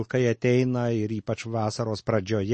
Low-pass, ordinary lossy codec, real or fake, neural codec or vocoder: 10.8 kHz; MP3, 32 kbps; real; none